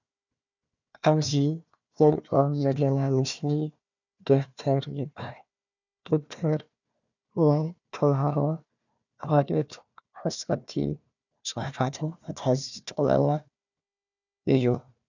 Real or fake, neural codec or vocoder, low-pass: fake; codec, 16 kHz, 1 kbps, FunCodec, trained on Chinese and English, 50 frames a second; 7.2 kHz